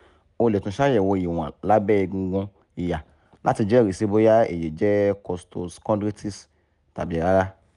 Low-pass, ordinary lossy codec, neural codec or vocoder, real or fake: 10.8 kHz; none; none; real